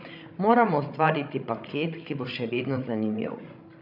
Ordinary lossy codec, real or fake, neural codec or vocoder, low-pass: none; fake; codec, 16 kHz, 16 kbps, FreqCodec, larger model; 5.4 kHz